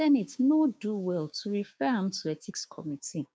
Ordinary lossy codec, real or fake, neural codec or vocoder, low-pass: none; fake; codec, 16 kHz, 6 kbps, DAC; none